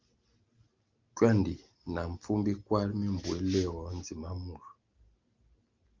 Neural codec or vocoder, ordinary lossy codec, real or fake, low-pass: none; Opus, 32 kbps; real; 7.2 kHz